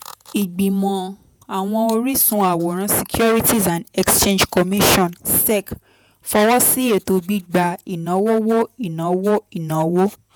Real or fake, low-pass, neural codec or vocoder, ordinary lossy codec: fake; none; vocoder, 48 kHz, 128 mel bands, Vocos; none